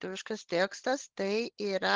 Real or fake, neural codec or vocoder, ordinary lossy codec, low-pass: fake; codec, 16 kHz, 16 kbps, FunCodec, trained on LibriTTS, 50 frames a second; Opus, 16 kbps; 7.2 kHz